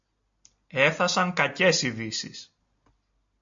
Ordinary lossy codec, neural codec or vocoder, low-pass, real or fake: AAC, 64 kbps; none; 7.2 kHz; real